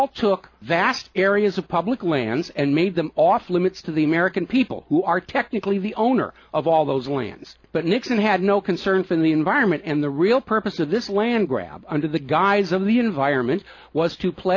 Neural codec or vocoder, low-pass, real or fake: none; 7.2 kHz; real